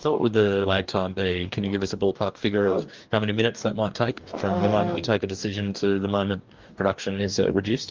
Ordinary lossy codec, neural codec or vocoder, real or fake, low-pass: Opus, 24 kbps; codec, 44.1 kHz, 2.6 kbps, DAC; fake; 7.2 kHz